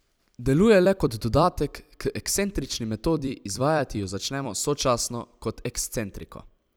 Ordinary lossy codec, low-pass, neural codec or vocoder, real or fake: none; none; vocoder, 44.1 kHz, 128 mel bands every 256 samples, BigVGAN v2; fake